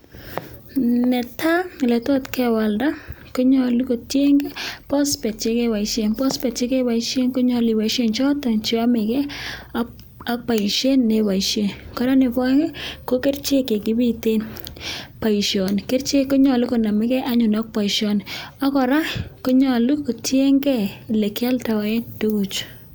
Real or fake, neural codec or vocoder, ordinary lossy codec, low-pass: real; none; none; none